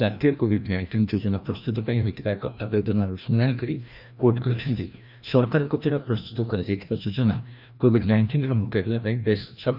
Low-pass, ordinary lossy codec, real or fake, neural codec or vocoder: 5.4 kHz; none; fake; codec, 16 kHz, 1 kbps, FreqCodec, larger model